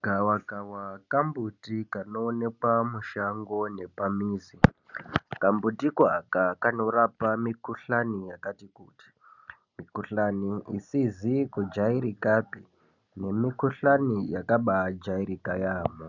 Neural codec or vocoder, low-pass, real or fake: none; 7.2 kHz; real